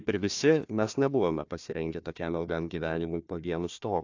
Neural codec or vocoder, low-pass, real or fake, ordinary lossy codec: codec, 16 kHz, 1 kbps, FunCodec, trained on Chinese and English, 50 frames a second; 7.2 kHz; fake; AAC, 48 kbps